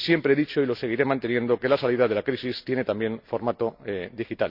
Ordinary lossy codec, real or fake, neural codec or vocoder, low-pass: none; real; none; 5.4 kHz